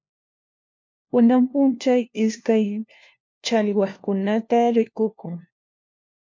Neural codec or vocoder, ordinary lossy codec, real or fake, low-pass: codec, 16 kHz, 1 kbps, FunCodec, trained on LibriTTS, 50 frames a second; MP3, 48 kbps; fake; 7.2 kHz